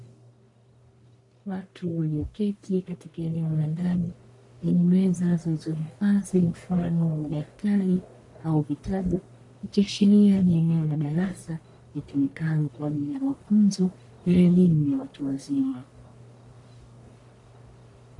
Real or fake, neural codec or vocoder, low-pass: fake; codec, 44.1 kHz, 1.7 kbps, Pupu-Codec; 10.8 kHz